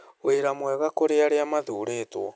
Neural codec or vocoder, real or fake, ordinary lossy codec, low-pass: none; real; none; none